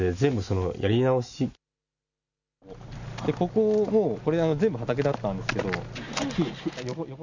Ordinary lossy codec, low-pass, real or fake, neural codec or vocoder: none; 7.2 kHz; real; none